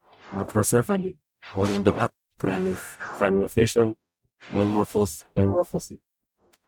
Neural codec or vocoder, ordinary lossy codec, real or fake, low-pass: codec, 44.1 kHz, 0.9 kbps, DAC; none; fake; none